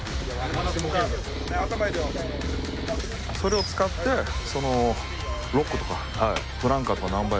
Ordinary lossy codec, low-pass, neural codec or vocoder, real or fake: none; none; none; real